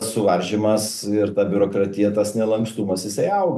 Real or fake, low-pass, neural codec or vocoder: real; 14.4 kHz; none